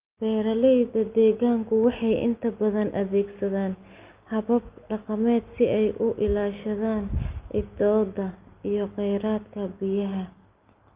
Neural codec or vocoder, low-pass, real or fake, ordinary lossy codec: none; 3.6 kHz; real; Opus, 24 kbps